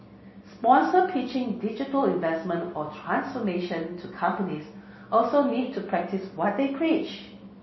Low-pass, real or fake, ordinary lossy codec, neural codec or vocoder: 7.2 kHz; real; MP3, 24 kbps; none